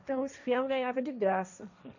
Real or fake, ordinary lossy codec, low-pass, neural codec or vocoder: fake; none; 7.2 kHz; codec, 16 kHz, 1.1 kbps, Voila-Tokenizer